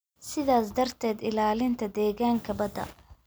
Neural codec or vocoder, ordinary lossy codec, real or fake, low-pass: none; none; real; none